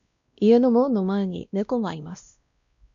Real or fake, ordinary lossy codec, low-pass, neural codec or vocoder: fake; MP3, 96 kbps; 7.2 kHz; codec, 16 kHz, 1 kbps, X-Codec, WavLM features, trained on Multilingual LibriSpeech